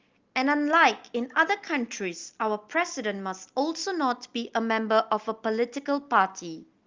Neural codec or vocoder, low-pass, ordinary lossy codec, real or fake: none; 7.2 kHz; Opus, 32 kbps; real